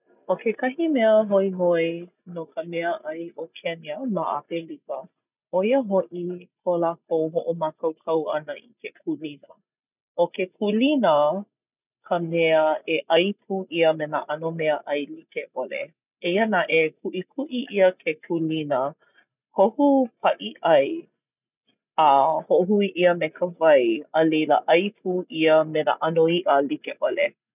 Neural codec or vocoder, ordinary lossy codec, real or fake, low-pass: none; none; real; 3.6 kHz